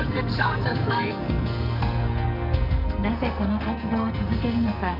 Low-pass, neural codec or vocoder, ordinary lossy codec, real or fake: 5.4 kHz; codec, 44.1 kHz, 2.6 kbps, SNAC; none; fake